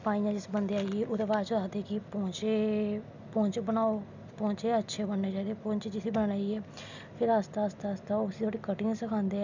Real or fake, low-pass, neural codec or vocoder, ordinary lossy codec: real; 7.2 kHz; none; none